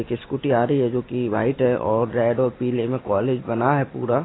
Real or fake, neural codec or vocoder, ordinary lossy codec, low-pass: real; none; AAC, 16 kbps; 7.2 kHz